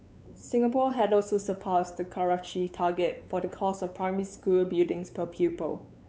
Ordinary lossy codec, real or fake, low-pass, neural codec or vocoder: none; fake; none; codec, 16 kHz, 4 kbps, X-Codec, WavLM features, trained on Multilingual LibriSpeech